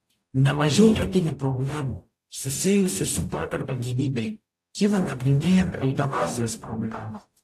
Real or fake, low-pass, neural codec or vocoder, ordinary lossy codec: fake; 14.4 kHz; codec, 44.1 kHz, 0.9 kbps, DAC; AAC, 64 kbps